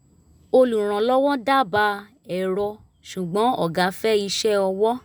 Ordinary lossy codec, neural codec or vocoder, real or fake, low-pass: none; none; real; none